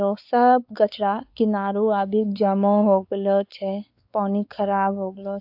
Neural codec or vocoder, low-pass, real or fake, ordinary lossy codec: codec, 16 kHz, 4 kbps, X-Codec, WavLM features, trained on Multilingual LibriSpeech; 5.4 kHz; fake; AAC, 48 kbps